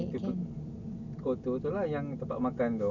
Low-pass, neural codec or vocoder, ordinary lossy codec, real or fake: 7.2 kHz; none; none; real